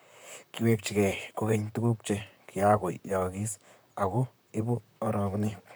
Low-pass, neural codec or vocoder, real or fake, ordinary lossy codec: none; vocoder, 44.1 kHz, 128 mel bands, Pupu-Vocoder; fake; none